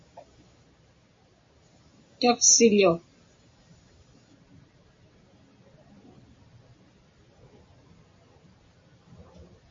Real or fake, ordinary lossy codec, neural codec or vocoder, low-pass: real; MP3, 32 kbps; none; 7.2 kHz